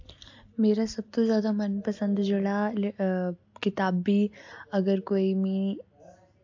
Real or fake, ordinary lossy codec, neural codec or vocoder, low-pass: real; AAC, 48 kbps; none; 7.2 kHz